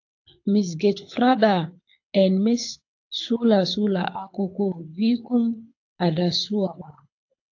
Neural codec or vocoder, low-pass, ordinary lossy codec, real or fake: codec, 24 kHz, 6 kbps, HILCodec; 7.2 kHz; AAC, 48 kbps; fake